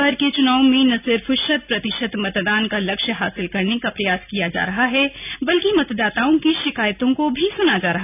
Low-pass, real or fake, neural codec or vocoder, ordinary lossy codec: 3.6 kHz; real; none; none